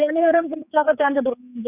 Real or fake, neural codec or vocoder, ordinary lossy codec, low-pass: fake; codec, 16 kHz, 4 kbps, X-Codec, HuBERT features, trained on general audio; none; 3.6 kHz